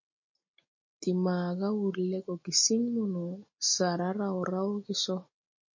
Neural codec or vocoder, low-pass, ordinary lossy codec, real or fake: none; 7.2 kHz; MP3, 32 kbps; real